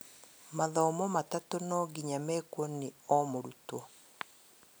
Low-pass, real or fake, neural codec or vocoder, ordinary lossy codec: none; real; none; none